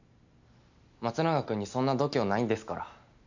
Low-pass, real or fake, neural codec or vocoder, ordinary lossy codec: 7.2 kHz; real; none; none